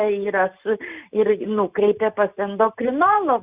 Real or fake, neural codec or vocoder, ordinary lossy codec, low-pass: real; none; Opus, 64 kbps; 3.6 kHz